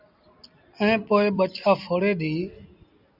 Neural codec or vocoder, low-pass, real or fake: none; 5.4 kHz; real